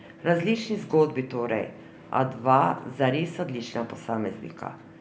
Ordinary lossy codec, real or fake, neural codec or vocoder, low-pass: none; real; none; none